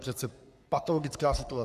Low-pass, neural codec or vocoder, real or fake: 14.4 kHz; codec, 44.1 kHz, 3.4 kbps, Pupu-Codec; fake